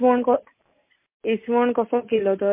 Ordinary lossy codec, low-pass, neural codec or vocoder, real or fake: MP3, 24 kbps; 3.6 kHz; none; real